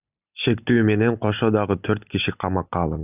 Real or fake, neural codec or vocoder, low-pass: real; none; 3.6 kHz